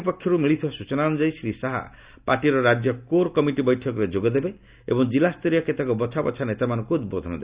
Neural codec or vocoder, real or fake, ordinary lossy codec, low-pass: none; real; Opus, 64 kbps; 3.6 kHz